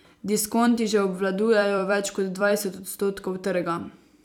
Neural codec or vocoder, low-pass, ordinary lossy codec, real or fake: vocoder, 44.1 kHz, 128 mel bands every 256 samples, BigVGAN v2; 19.8 kHz; none; fake